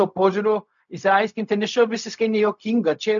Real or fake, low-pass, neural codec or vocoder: fake; 7.2 kHz; codec, 16 kHz, 0.4 kbps, LongCat-Audio-Codec